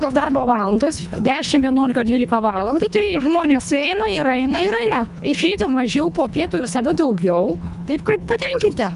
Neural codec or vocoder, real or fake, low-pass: codec, 24 kHz, 1.5 kbps, HILCodec; fake; 10.8 kHz